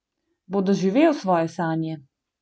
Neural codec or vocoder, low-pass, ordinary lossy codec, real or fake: none; none; none; real